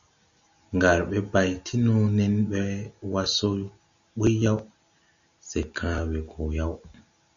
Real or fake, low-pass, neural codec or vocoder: real; 7.2 kHz; none